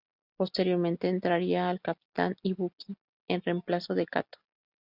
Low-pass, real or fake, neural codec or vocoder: 5.4 kHz; real; none